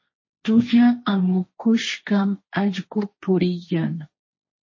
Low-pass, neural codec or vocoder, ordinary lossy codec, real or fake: 7.2 kHz; codec, 16 kHz, 1.1 kbps, Voila-Tokenizer; MP3, 32 kbps; fake